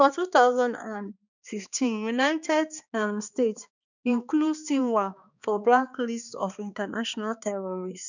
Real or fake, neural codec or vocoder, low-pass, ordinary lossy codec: fake; codec, 16 kHz, 2 kbps, X-Codec, HuBERT features, trained on balanced general audio; 7.2 kHz; none